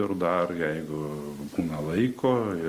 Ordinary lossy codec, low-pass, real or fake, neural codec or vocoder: Opus, 32 kbps; 14.4 kHz; real; none